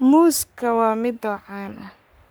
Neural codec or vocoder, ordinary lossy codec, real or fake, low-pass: codec, 44.1 kHz, 3.4 kbps, Pupu-Codec; none; fake; none